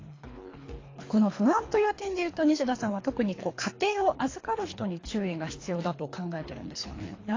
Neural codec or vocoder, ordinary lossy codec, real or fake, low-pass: codec, 24 kHz, 3 kbps, HILCodec; AAC, 32 kbps; fake; 7.2 kHz